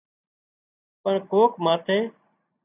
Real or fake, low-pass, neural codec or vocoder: real; 3.6 kHz; none